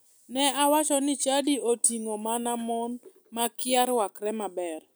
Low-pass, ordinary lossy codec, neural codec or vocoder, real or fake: none; none; none; real